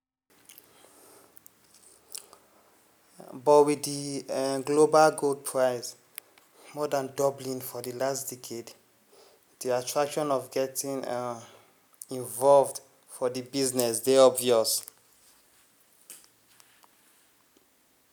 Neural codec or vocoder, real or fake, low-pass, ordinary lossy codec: none; real; none; none